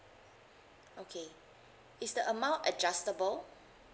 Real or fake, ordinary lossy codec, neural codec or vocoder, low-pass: real; none; none; none